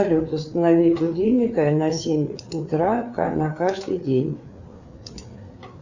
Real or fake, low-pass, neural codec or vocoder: fake; 7.2 kHz; codec, 16 kHz, 4 kbps, FreqCodec, larger model